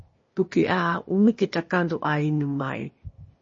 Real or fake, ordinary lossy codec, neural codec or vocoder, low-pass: fake; MP3, 32 kbps; codec, 16 kHz, 0.8 kbps, ZipCodec; 7.2 kHz